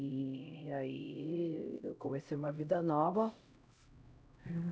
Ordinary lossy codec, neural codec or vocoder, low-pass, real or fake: none; codec, 16 kHz, 0.5 kbps, X-Codec, HuBERT features, trained on LibriSpeech; none; fake